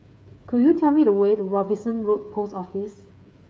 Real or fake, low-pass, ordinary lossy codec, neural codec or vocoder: fake; none; none; codec, 16 kHz, 8 kbps, FreqCodec, smaller model